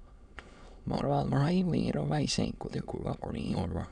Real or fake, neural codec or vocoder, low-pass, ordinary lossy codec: fake; autoencoder, 22.05 kHz, a latent of 192 numbers a frame, VITS, trained on many speakers; 9.9 kHz; none